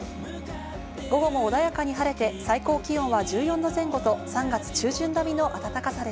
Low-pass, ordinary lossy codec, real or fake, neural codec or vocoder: none; none; real; none